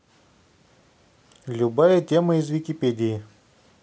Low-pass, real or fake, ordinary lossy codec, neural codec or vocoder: none; real; none; none